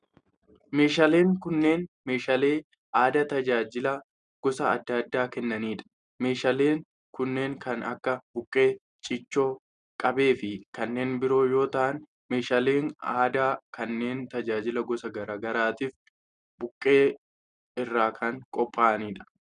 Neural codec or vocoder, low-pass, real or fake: none; 9.9 kHz; real